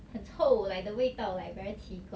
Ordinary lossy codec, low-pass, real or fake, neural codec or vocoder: none; none; real; none